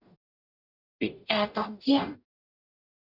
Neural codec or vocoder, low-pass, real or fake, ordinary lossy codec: codec, 44.1 kHz, 0.9 kbps, DAC; 5.4 kHz; fake; none